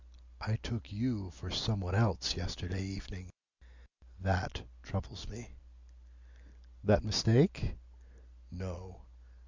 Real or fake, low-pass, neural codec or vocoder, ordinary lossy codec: real; 7.2 kHz; none; Opus, 64 kbps